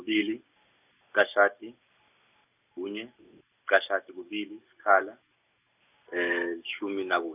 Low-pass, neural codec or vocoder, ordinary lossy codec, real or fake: 3.6 kHz; none; none; real